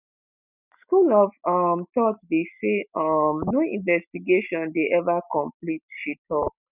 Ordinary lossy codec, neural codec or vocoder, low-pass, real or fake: none; none; 3.6 kHz; real